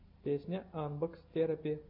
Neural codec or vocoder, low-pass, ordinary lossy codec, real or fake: none; 5.4 kHz; AAC, 48 kbps; real